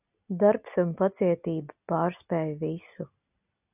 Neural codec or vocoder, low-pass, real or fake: none; 3.6 kHz; real